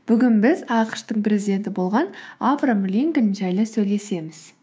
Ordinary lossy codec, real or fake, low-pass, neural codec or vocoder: none; fake; none; codec, 16 kHz, 6 kbps, DAC